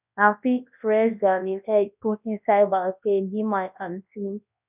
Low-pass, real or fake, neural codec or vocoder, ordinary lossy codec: 3.6 kHz; fake; codec, 24 kHz, 0.9 kbps, WavTokenizer, large speech release; none